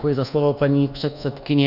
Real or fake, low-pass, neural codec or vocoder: fake; 5.4 kHz; codec, 24 kHz, 1.2 kbps, DualCodec